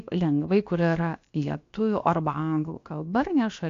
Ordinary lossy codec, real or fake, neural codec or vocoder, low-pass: AAC, 48 kbps; fake; codec, 16 kHz, about 1 kbps, DyCAST, with the encoder's durations; 7.2 kHz